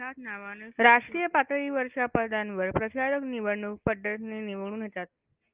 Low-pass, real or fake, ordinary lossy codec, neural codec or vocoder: 3.6 kHz; real; Opus, 32 kbps; none